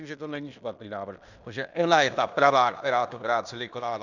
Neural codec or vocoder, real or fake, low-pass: codec, 16 kHz in and 24 kHz out, 0.9 kbps, LongCat-Audio-Codec, fine tuned four codebook decoder; fake; 7.2 kHz